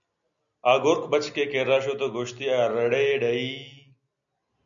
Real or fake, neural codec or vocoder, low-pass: real; none; 7.2 kHz